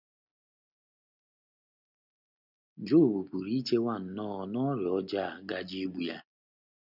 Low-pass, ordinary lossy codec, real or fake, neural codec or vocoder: 5.4 kHz; none; real; none